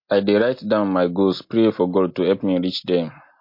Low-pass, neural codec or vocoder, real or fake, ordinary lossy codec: 5.4 kHz; none; real; MP3, 32 kbps